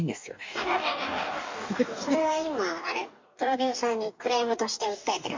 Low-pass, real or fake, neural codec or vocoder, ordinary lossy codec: 7.2 kHz; fake; codec, 44.1 kHz, 2.6 kbps, DAC; MP3, 48 kbps